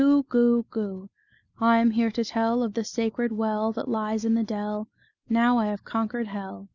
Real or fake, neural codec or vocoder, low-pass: real; none; 7.2 kHz